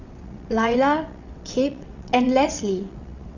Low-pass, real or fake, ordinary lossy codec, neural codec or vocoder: 7.2 kHz; fake; none; vocoder, 22.05 kHz, 80 mel bands, WaveNeXt